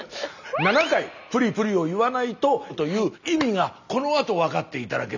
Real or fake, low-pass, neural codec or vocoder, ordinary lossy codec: real; 7.2 kHz; none; none